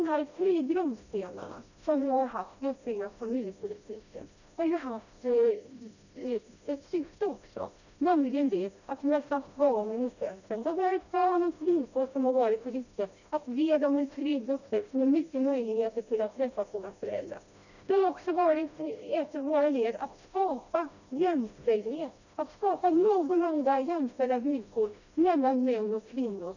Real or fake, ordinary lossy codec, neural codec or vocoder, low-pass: fake; none; codec, 16 kHz, 1 kbps, FreqCodec, smaller model; 7.2 kHz